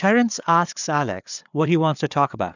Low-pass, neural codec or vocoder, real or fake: 7.2 kHz; codec, 16 kHz, 4 kbps, FreqCodec, larger model; fake